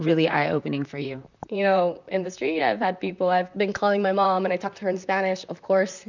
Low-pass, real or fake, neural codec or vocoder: 7.2 kHz; fake; vocoder, 44.1 kHz, 128 mel bands, Pupu-Vocoder